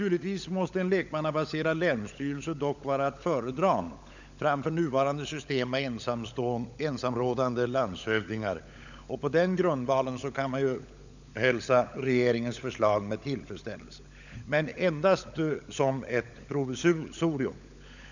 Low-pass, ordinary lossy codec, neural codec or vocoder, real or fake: 7.2 kHz; none; codec, 16 kHz, 16 kbps, FunCodec, trained on LibriTTS, 50 frames a second; fake